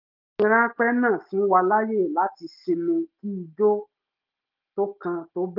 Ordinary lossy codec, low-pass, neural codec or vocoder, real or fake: Opus, 24 kbps; 5.4 kHz; none; real